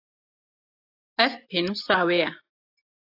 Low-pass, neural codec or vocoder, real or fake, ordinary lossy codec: 5.4 kHz; none; real; AAC, 32 kbps